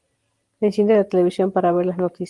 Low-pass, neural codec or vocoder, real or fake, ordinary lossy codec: 10.8 kHz; none; real; Opus, 24 kbps